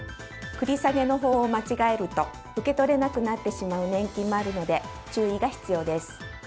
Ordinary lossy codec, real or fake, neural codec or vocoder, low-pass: none; real; none; none